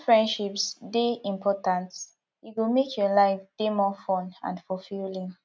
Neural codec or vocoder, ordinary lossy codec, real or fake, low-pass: none; none; real; none